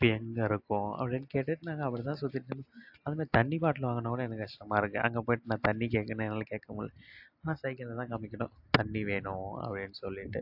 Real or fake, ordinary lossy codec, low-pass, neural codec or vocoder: real; AAC, 48 kbps; 5.4 kHz; none